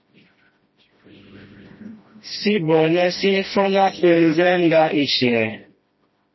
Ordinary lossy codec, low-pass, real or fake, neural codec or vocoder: MP3, 24 kbps; 7.2 kHz; fake; codec, 16 kHz, 1 kbps, FreqCodec, smaller model